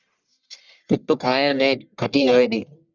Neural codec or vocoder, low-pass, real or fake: codec, 44.1 kHz, 1.7 kbps, Pupu-Codec; 7.2 kHz; fake